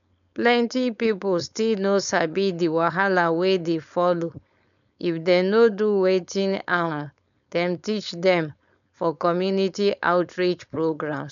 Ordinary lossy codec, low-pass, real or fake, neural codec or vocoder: none; 7.2 kHz; fake; codec, 16 kHz, 4.8 kbps, FACodec